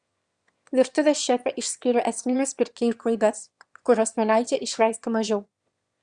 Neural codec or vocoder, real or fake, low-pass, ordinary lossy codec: autoencoder, 22.05 kHz, a latent of 192 numbers a frame, VITS, trained on one speaker; fake; 9.9 kHz; Opus, 64 kbps